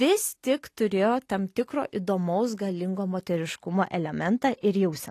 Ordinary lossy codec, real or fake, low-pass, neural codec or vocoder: AAC, 48 kbps; real; 14.4 kHz; none